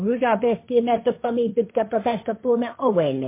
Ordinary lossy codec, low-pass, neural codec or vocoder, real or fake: MP3, 24 kbps; 3.6 kHz; codec, 16 kHz, 1.1 kbps, Voila-Tokenizer; fake